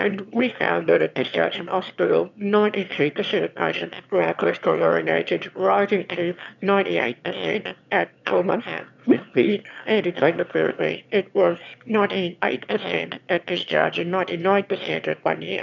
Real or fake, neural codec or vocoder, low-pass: fake; autoencoder, 22.05 kHz, a latent of 192 numbers a frame, VITS, trained on one speaker; 7.2 kHz